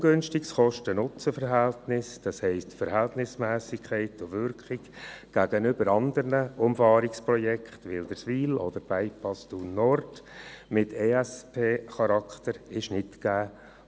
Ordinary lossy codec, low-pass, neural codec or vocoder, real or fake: none; none; none; real